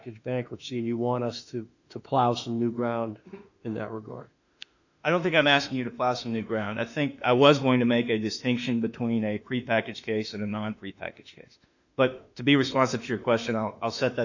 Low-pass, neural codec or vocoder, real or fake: 7.2 kHz; autoencoder, 48 kHz, 32 numbers a frame, DAC-VAE, trained on Japanese speech; fake